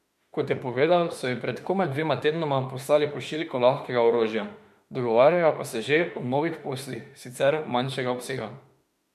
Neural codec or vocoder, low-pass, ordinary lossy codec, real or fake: autoencoder, 48 kHz, 32 numbers a frame, DAC-VAE, trained on Japanese speech; 14.4 kHz; MP3, 64 kbps; fake